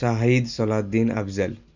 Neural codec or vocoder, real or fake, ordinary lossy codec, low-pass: none; real; none; 7.2 kHz